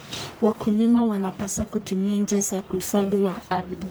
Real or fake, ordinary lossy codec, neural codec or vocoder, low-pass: fake; none; codec, 44.1 kHz, 1.7 kbps, Pupu-Codec; none